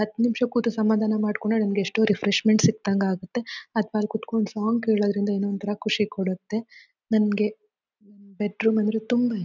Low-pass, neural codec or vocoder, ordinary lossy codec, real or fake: 7.2 kHz; none; none; real